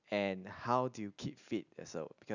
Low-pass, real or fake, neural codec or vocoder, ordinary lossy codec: 7.2 kHz; real; none; none